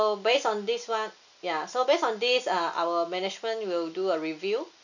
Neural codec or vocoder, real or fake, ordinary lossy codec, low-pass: none; real; none; 7.2 kHz